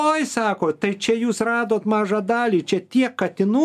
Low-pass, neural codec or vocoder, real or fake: 14.4 kHz; none; real